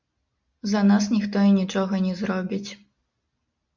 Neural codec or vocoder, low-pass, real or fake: none; 7.2 kHz; real